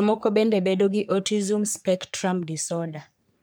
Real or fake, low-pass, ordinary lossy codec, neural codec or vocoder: fake; none; none; codec, 44.1 kHz, 3.4 kbps, Pupu-Codec